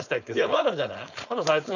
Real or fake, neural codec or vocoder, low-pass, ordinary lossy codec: fake; codec, 16 kHz, 4.8 kbps, FACodec; 7.2 kHz; none